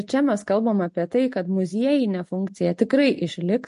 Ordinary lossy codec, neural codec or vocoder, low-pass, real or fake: MP3, 48 kbps; none; 10.8 kHz; real